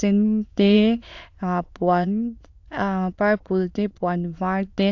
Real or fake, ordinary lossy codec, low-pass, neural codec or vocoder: fake; AAC, 48 kbps; 7.2 kHz; autoencoder, 22.05 kHz, a latent of 192 numbers a frame, VITS, trained on many speakers